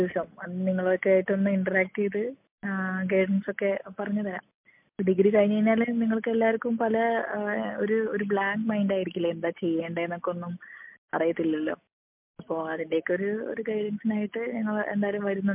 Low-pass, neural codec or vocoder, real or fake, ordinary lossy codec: 3.6 kHz; none; real; MP3, 32 kbps